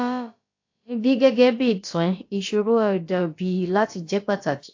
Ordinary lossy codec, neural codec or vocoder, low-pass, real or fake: AAC, 48 kbps; codec, 16 kHz, about 1 kbps, DyCAST, with the encoder's durations; 7.2 kHz; fake